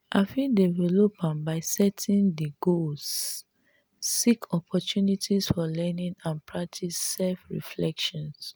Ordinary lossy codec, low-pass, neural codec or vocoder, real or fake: none; none; none; real